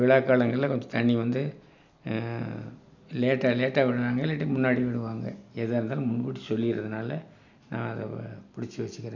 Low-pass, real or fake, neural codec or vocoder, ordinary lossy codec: 7.2 kHz; real; none; none